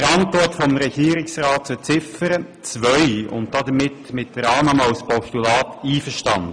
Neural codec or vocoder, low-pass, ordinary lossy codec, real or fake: none; 9.9 kHz; MP3, 96 kbps; real